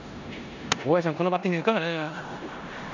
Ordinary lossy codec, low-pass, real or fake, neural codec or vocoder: none; 7.2 kHz; fake; codec, 16 kHz in and 24 kHz out, 0.9 kbps, LongCat-Audio-Codec, four codebook decoder